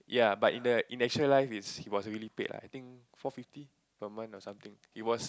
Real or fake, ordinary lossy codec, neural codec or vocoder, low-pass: real; none; none; none